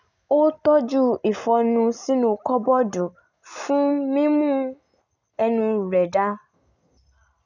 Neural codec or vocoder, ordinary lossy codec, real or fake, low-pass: none; none; real; 7.2 kHz